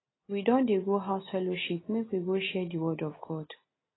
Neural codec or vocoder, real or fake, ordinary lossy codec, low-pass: none; real; AAC, 16 kbps; 7.2 kHz